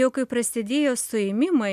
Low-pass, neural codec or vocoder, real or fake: 14.4 kHz; none; real